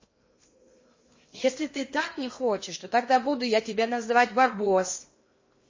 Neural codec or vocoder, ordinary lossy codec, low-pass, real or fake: codec, 16 kHz in and 24 kHz out, 0.8 kbps, FocalCodec, streaming, 65536 codes; MP3, 32 kbps; 7.2 kHz; fake